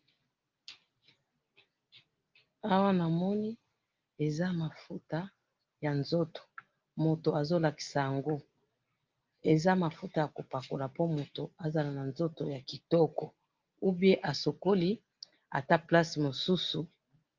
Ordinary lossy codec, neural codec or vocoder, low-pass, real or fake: Opus, 24 kbps; none; 7.2 kHz; real